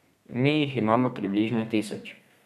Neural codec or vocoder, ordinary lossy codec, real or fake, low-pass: codec, 32 kHz, 1.9 kbps, SNAC; none; fake; 14.4 kHz